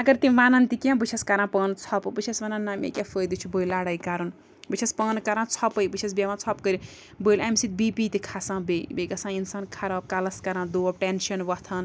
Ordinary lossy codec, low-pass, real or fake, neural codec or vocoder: none; none; real; none